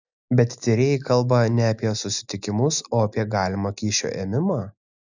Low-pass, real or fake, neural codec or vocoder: 7.2 kHz; real; none